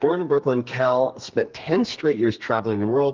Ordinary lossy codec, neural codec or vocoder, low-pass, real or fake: Opus, 24 kbps; codec, 32 kHz, 1.9 kbps, SNAC; 7.2 kHz; fake